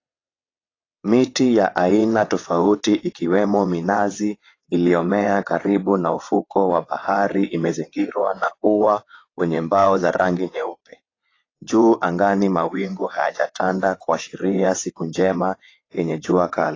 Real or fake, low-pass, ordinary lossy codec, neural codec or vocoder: fake; 7.2 kHz; AAC, 32 kbps; vocoder, 22.05 kHz, 80 mel bands, WaveNeXt